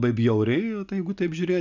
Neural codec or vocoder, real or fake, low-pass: none; real; 7.2 kHz